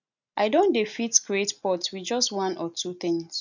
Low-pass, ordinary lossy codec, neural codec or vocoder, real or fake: 7.2 kHz; none; none; real